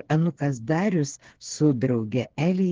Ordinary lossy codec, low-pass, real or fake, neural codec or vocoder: Opus, 16 kbps; 7.2 kHz; fake; codec, 16 kHz, 4 kbps, FreqCodec, smaller model